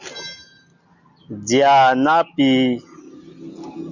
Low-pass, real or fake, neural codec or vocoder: 7.2 kHz; real; none